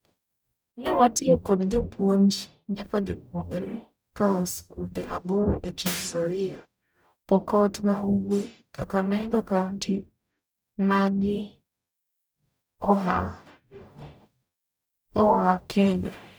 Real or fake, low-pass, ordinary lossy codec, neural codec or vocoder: fake; none; none; codec, 44.1 kHz, 0.9 kbps, DAC